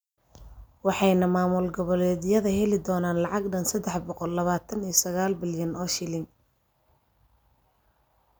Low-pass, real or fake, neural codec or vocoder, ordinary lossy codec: none; real; none; none